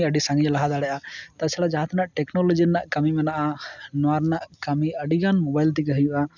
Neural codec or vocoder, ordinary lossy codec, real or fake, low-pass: none; none; real; 7.2 kHz